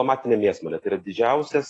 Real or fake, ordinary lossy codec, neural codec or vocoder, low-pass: real; AAC, 32 kbps; none; 10.8 kHz